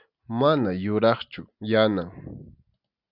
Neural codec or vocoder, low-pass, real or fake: none; 5.4 kHz; real